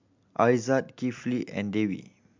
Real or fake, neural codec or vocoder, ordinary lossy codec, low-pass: real; none; MP3, 64 kbps; 7.2 kHz